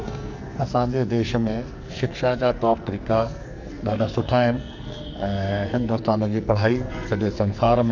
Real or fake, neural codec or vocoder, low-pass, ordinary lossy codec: fake; codec, 44.1 kHz, 2.6 kbps, SNAC; 7.2 kHz; none